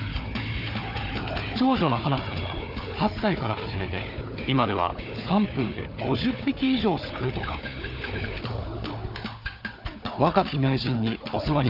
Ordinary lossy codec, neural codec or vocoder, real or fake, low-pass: none; codec, 16 kHz, 4 kbps, FunCodec, trained on Chinese and English, 50 frames a second; fake; 5.4 kHz